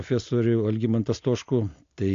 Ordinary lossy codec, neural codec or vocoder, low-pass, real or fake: AAC, 48 kbps; none; 7.2 kHz; real